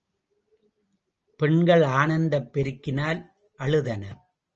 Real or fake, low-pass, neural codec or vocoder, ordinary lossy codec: real; 7.2 kHz; none; Opus, 24 kbps